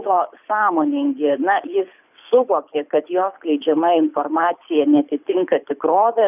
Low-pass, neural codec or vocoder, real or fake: 3.6 kHz; codec, 24 kHz, 6 kbps, HILCodec; fake